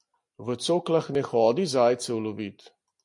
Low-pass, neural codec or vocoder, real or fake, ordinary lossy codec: 10.8 kHz; none; real; MP3, 48 kbps